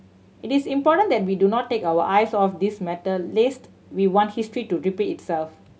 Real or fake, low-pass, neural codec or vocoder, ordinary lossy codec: real; none; none; none